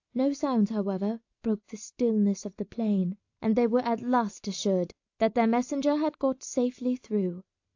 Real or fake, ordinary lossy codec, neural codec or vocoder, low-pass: real; AAC, 48 kbps; none; 7.2 kHz